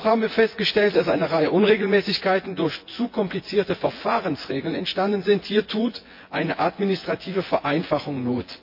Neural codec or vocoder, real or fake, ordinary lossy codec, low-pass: vocoder, 24 kHz, 100 mel bands, Vocos; fake; MP3, 32 kbps; 5.4 kHz